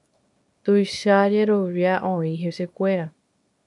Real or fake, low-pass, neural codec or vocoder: fake; 10.8 kHz; codec, 24 kHz, 0.9 kbps, WavTokenizer, small release